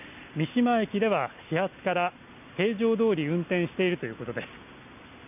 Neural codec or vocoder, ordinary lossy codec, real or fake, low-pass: none; none; real; 3.6 kHz